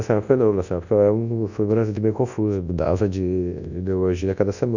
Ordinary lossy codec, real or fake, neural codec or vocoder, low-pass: none; fake; codec, 24 kHz, 0.9 kbps, WavTokenizer, large speech release; 7.2 kHz